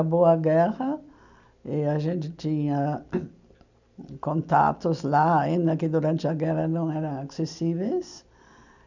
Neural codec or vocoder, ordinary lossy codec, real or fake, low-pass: none; none; real; 7.2 kHz